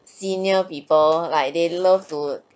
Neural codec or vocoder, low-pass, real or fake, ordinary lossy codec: none; none; real; none